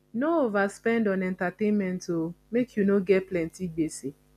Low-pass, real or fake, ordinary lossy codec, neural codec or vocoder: 14.4 kHz; real; none; none